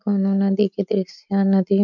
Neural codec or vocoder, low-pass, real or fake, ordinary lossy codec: none; 7.2 kHz; real; none